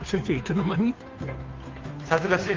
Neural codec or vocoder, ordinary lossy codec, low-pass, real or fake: codec, 16 kHz, 2 kbps, FunCodec, trained on Chinese and English, 25 frames a second; Opus, 32 kbps; 7.2 kHz; fake